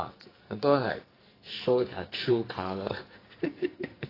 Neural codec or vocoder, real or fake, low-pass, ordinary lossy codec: codec, 44.1 kHz, 2.6 kbps, SNAC; fake; 5.4 kHz; AAC, 24 kbps